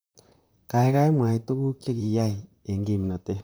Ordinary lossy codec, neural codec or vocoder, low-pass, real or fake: none; vocoder, 44.1 kHz, 128 mel bands, Pupu-Vocoder; none; fake